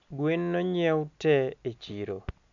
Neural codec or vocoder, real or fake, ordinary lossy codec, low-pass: none; real; none; 7.2 kHz